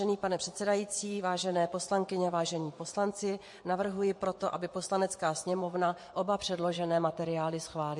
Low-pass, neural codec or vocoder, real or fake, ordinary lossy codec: 10.8 kHz; vocoder, 24 kHz, 100 mel bands, Vocos; fake; MP3, 48 kbps